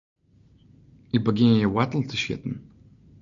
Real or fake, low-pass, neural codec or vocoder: real; 7.2 kHz; none